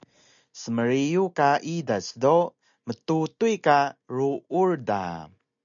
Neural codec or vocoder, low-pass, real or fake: none; 7.2 kHz; real